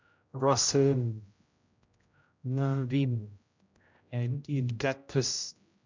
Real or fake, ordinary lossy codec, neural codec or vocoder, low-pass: fake; MP3, 64 kbps; codec, 16 kHz, 0.5 kbps, X-Codec, HuBERT features, trained on general audio; 7.2 kHz